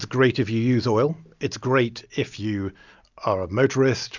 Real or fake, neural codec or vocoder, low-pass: real; none; 7.2 kHz